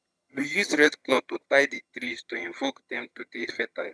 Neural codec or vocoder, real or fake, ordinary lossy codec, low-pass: vocoder, 22.05 kHz, 80 mel bands, HiFi-GAN; fake; none; none